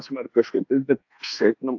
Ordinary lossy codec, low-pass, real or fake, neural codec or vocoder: AAC, 48 kbps; 7.2 kHz; fake; codec, 24 kHz, 1.2 kbps, DualCodec